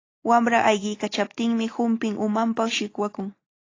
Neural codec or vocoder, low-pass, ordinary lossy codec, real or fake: none; 7.2 kHz; AAC, 32 kbps; real